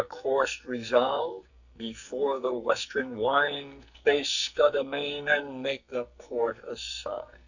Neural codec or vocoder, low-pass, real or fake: codec, 44.1 kHz, 2.6 kbps, SNAC; 7.2 kHz; fake